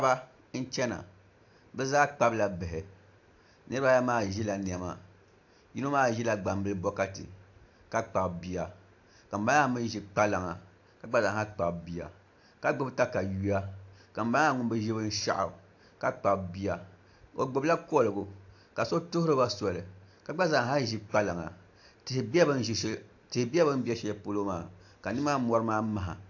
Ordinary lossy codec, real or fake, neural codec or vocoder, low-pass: AAC, 48 kbps; real; none; 7.2 kHz